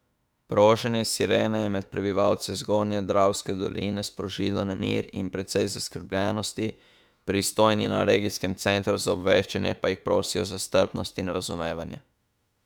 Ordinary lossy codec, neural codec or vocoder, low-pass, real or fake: none; autoencoder, 48 kHz, 32 numbers a frame, DAC-VAE, trained on Japanese speech; 19.8 kHz; fake